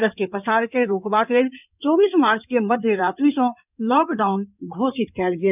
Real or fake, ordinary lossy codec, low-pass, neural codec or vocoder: fake; none; 3.6 kHz; codec, 16 kHz in and 24 kHz out, 2.2 kbps, FireRedTTS-2 codec